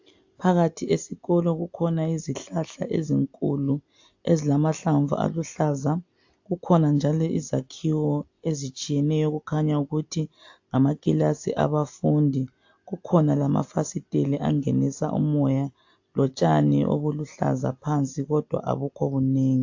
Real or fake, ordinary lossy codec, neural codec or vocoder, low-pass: real; AAC, 48 kbps; none; 7.2 kHz